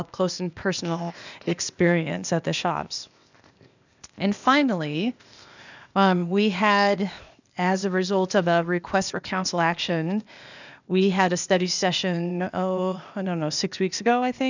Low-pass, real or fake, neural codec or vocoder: 7.2 kHz; fake; codec, 16 kHz, 0.8 kbps, ZipCodec